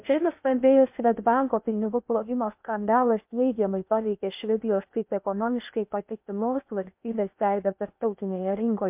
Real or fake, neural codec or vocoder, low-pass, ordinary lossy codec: fake; codec, 16 kHz in and 24 kHz out, 0.6 kbps, FocalCodec, streaming, 2048 codes; 3.6 kHz; MP3, 32 kbps